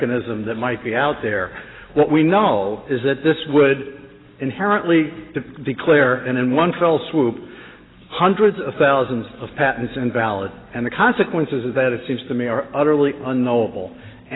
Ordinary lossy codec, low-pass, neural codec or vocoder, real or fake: AAC, 16 kbps; 7.2 kHz; none; real